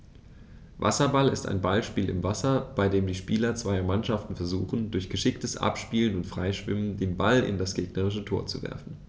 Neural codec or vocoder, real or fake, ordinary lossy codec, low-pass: none; real; none; none